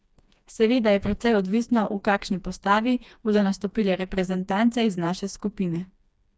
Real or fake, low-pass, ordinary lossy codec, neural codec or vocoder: fake; none; none; codec, 16 kHz, 2 kbps, FreqCodec, smaller model